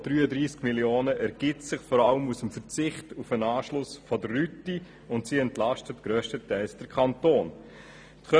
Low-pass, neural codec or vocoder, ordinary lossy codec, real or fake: none; none; none; real